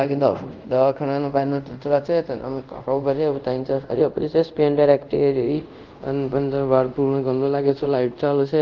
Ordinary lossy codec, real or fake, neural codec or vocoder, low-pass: Opus, 32 kbps; fake; codec, 24 kHz, 0.5 kbps, DualCodec; 7.2 kHz